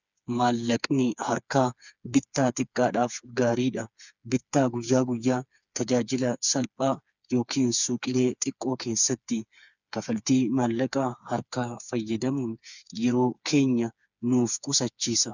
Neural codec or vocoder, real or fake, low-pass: codec, 16 kHz, 4 kbps, FreqCodec, smaller model; fake; 7.2 kHz